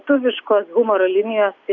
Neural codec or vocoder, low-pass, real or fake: none; 7.2 kHz; real